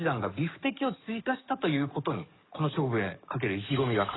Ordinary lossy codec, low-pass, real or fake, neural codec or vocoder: AAC, 16 kbps; 7.2 kHz; fake; codec, 44.1 kHz, 7.8 kbps, DAC